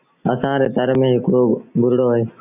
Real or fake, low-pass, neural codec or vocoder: real; 3.6 kHz; none